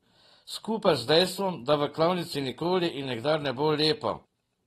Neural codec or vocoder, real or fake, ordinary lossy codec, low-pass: none; real; AAC, 32 kbps; 19.8 kHz